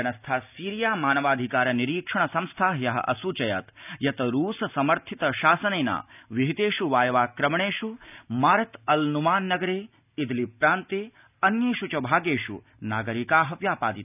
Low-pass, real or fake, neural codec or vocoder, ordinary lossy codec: 3.6 kHz; real; none; none